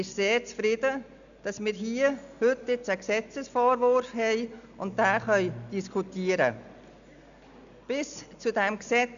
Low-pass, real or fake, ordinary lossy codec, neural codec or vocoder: 7.2 kHz; real; none; none